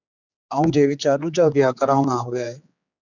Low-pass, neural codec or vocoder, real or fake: 7.2 kHz; codec, 16 kHz, 4 kbps, X-Codec, HuBERT features, trained on general audio; fake